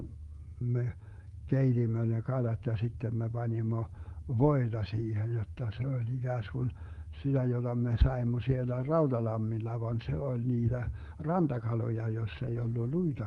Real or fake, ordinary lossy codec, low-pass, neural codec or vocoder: fake; Opus, 24 kbps; 10.8 kHz; codec, 24 kHz, 3.1 kbps, DualCodec